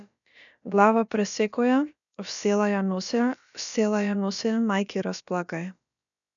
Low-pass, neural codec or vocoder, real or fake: 7.2 kHz; codec, 16 kHz, about 1 kbps, DyCAST, with the encoder's durations; fake